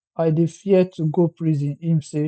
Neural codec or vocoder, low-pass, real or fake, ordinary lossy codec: none; none; real; none